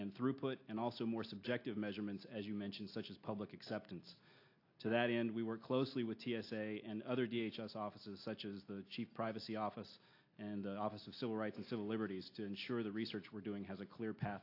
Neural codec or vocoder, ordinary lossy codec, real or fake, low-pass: none; AAC, 32 kbps; real; 5.4 kHz